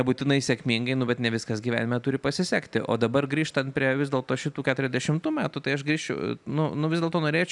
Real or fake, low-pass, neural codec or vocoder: real; 10.8 kHz; none